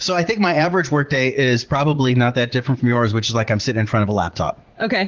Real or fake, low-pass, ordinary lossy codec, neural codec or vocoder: fake; 7.2 kHz; Opus, 16 kbps; vocoder, 44.1 kHz, 80 mel bands, Vocos